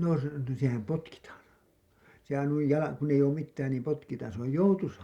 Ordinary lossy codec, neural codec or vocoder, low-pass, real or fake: Opus, 64 kbps; none; 19.8 kHz; real